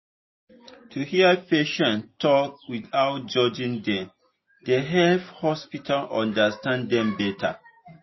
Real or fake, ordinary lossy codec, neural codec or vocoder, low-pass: real; MP3, 24 kbps; none; 7.2 kHz